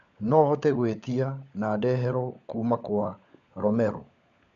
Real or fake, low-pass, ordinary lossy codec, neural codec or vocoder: fake; 7.2 kHz; MP3, 64 kbps; codec, 16 kHz, 16 kbps, FunCodec, trained on LibriTTS, 50 frames a second